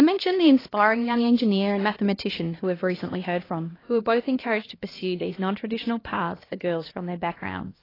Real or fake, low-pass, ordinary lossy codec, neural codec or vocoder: fake; 5.4 kHz; AAC, 24 kbps; codec, 16 kHz, 1 kbps, X-Codec, HuBERT features, trained on LibriSpeech